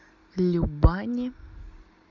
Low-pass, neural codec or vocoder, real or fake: 7.2 kHz; none; real